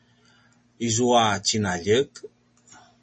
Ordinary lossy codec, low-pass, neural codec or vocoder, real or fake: MP3, 32 kbps; 10.8 kHz; none; real